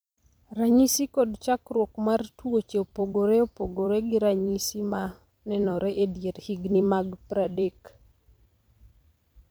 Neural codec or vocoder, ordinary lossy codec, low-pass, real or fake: vocoder, 44.1 kHz, 128 mel bands every 256 samples, BigVGAN v2; none; none; fake